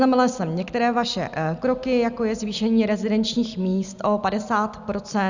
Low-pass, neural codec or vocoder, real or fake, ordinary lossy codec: 7.2 kHz; none; real; Opus, 64 kbps